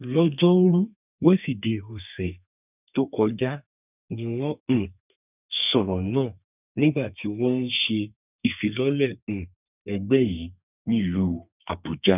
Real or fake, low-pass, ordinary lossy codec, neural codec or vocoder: fake; 3.6 kHz; none; codec, 32 kHz, 1.9 kbps, SNAC